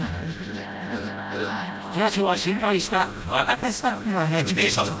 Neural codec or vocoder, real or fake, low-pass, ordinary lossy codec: codec, 16 kHz, 0.5 kbps, FreqCodec, smaller model; fake; none; none